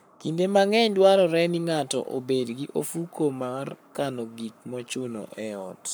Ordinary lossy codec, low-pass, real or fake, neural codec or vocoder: none; none; fake; codec, 44.1 kHz, 7.8 kbps, Pupu-Codec